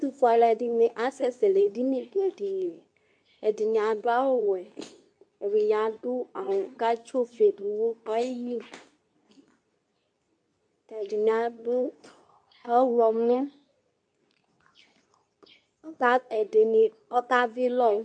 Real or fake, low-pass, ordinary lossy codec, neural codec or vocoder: fake; 9.9 kHz; MP3, 64 kbps; codec, 24 kHz, 0.9 kbps, WavTokenizer, medium speech release version 2